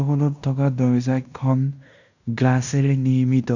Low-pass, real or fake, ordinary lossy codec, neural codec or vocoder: 7.2 kHz; fake; none; codec, 16 kHz in and 24 kHz out, 0.9 kbps, LongCat-Audio-Codec, fine tuned four codebook decoder